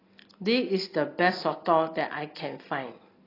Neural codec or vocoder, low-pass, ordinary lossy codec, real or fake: none; 5.4 kHz; AAC, 24 kbps; real